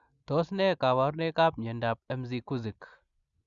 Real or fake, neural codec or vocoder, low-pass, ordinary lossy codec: real; none; 7.2 kHz; Opus, 64 kbps